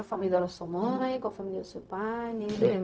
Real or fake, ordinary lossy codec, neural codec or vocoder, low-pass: fake; none; codec, 16 kHz, 0.4 kbps, LongCat-Audio-Codec; none